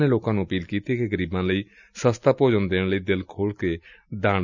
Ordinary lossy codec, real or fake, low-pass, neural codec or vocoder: none; real; 7.2 kHz; none